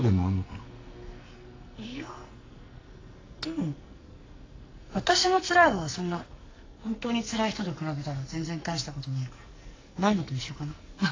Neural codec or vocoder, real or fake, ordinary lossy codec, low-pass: codec, 44.1 kHz, 2.6 kbps, SNAC; fake; AAC, 32 kbps; 7.2 kHz